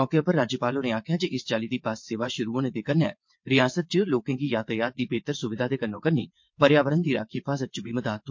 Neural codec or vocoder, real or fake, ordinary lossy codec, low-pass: vocoder, 22.05 kHz, 80 mel bands, WaveNeXt; fake; MP3, 48 kbps; 7.2 kHz